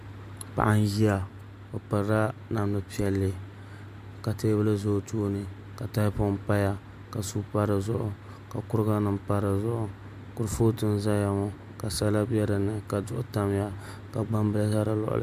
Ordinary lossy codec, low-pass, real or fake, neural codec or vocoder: MP3, 96 kbps; 14.4 kHz; real; none